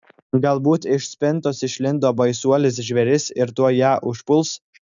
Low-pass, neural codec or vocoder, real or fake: 7.2 kHz; none; real